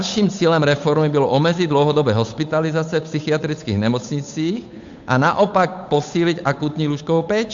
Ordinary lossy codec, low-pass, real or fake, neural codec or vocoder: AAC, 64 kbps; 7.2 kHz; fake; codec, 16 kHz, 8 kbps, FunCodec, trained on Chinese and English, 25 frames a second